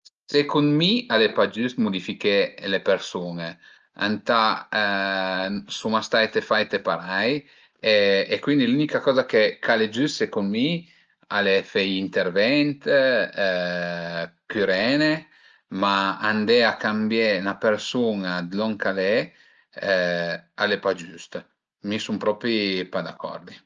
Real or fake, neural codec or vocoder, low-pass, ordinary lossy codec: real; none; 7.2 kHz; Opus, 24 kbps